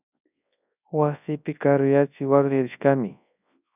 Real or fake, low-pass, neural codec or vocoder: fake; 3.6 kHz; codec, 24 kHz, 0.9 kbps, WavTokenizer, large speech release